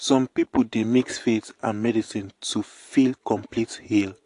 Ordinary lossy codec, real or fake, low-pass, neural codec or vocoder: AAC, 48 kbps; fake; 10.8 kHz; vocoder, 24 kHz, 100 mel bands, Vocos